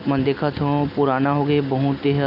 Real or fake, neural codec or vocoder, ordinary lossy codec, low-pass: real; none; none; 5.4 kHz